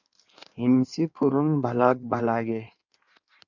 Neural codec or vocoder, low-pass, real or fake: codec, 16 kHz in and 24 kHz out, 1.1 kbps, FireRedTTS-2 codec; 7.2 kHz; fake